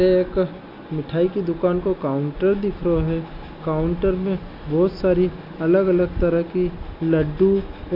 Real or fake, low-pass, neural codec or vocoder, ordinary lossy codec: real; 5.4 kHz; none; none